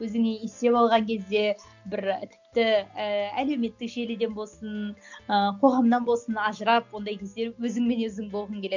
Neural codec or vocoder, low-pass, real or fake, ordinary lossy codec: none; 7.2 kHz; real; none